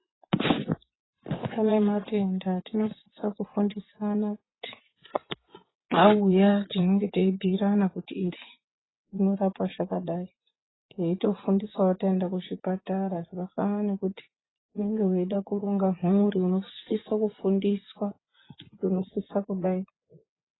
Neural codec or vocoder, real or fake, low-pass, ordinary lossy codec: vocoder, 24 kHz, 100 mel bands, Vocos; fake; 7.2 kHz; AAC, 16 kbps